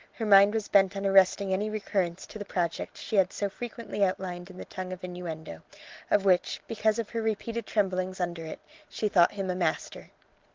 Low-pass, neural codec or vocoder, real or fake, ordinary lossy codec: 7.2 kHz; none; real; Opus, 16 kbps